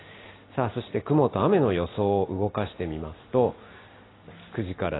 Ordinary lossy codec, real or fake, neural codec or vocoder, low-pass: AAC, 16 kbps; real; none; 7.2 kHz